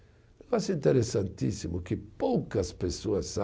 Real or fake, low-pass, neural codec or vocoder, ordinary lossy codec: real; none; none; none